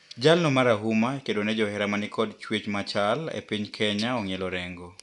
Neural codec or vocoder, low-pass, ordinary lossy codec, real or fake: none; 10.8 kHz; none; real